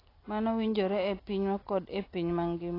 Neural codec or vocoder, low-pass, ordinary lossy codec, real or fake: none; 5.4 kHz; AAC, 24 kbps; real